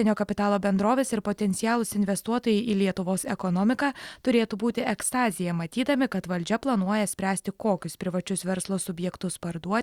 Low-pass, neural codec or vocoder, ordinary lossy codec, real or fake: 19.8 kHz; none; Opus, 64 kbps; real